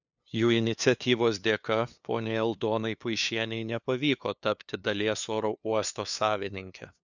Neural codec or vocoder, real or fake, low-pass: codec, 16 kHz, 2 kbps, FunCodec, trained on LibriTTS, 25 frames a second; fake; 7.2 kHz